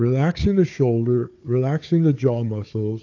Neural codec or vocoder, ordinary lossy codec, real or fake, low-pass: codec, 16 kHz, 4 kbps, FunCodec, trained on Chinese and English, 50 frames a second; AAC, 48 kbps; fake; 7.2 kHz